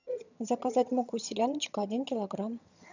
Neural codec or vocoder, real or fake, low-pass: vocoder, 22.05 kHz, 80 mel bands, HiFi-GAN; fake; 7.2 kHz